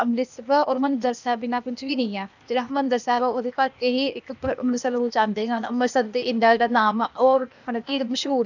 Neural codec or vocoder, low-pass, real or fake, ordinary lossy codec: codec, 16 kHz, 0.8 kbps, ZipCodec; 7.2 kHz; fake; none